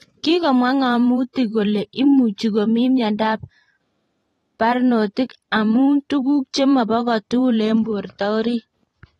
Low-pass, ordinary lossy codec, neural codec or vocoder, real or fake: 19.8 kHz; AAC, 32 kbps; vocoder, 44.1 kHz, 128 mel bands every 512 samples, BigVGAN v2; fake